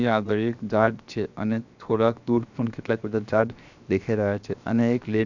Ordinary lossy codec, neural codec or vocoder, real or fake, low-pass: none; codec, 16 kHz, 0.7 kbps, FocalCodec; fake; 7.2 kHz